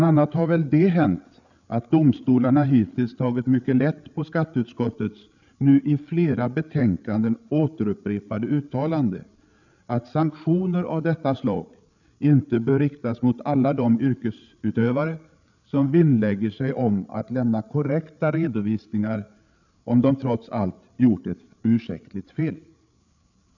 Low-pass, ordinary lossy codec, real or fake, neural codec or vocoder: 7.2 kHz; none; fake; codec, 16 kHz, 8 kbps, FreqCodec, larger model